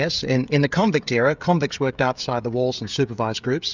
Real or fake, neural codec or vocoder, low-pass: fake; codec, 16 kHz, 16 kbps, FreqCodec, smaller model; 7.2 kHz